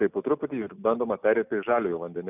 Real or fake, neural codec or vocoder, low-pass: real; none; 3.6 kHz